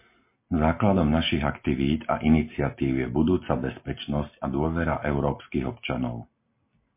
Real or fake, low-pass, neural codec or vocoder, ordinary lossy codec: real; 3.6 kHz; none; MP3, 24 kbps